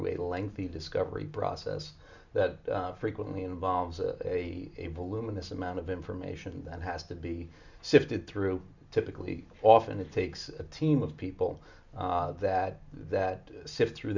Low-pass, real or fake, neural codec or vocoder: 7.2 kHz; real; none